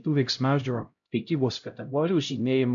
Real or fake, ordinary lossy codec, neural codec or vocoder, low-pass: fake; AAC, 64 kbps; codec, 16 kHz, 0.5 kbps, X-Codec, HuBERT features, trained on LibriSpeech; 7.2 kHz